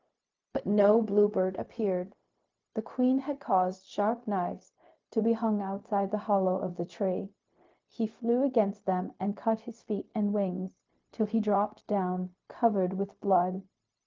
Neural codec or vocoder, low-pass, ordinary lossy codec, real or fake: codec, 16 kHz, 0.4 kbps, LongCat-Audio-Codec; 7.2 kHz; Opus, 32 kbps; fake